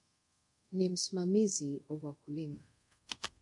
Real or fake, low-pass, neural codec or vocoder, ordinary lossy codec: fake; 10.8 kHz; codec, 24 kHz, 0.5 kbps, DualCodec; MP3, 64 kbps